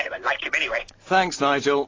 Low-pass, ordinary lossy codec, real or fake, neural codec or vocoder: 7.2 kHz; AAC, 32 kbps; real; none